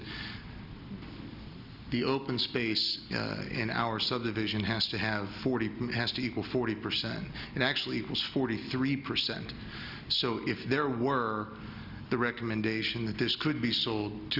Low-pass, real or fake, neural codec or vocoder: 5.4 kHz; real; none